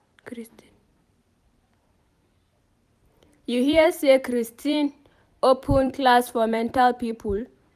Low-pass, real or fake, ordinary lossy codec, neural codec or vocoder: 14.4 kHz; fake; none; vocoder, 44.1 kHz, 128 mel bands every 256 samples, BigVGAN v2